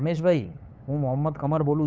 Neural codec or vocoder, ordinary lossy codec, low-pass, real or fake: codec, 16 kHz, 8 kbps, FunCodec, trained on LibriTTS, 25 frames a second; none; none; fake